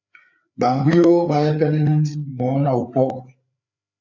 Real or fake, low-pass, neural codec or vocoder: fake; 7.2 kHz; codec, 16 kHz, 4 kbps, FreqCodec, larger model